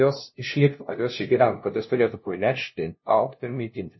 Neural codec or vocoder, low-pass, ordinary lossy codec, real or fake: codec, 16 kHz, 0.5 kbps, FunCodec, trained on LibriTTS, 25 frames a second; 7.2 kHz; MP3, 24 kbps; fake